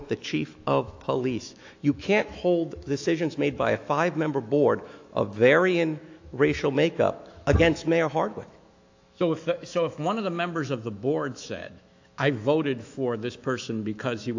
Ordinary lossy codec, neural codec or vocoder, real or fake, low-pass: AAC, 48 kbps; autoencoder, 48 kHz, 128 numbers a frame, DAC-VAE, trained on Japanese speech; fake; 7.2 kHz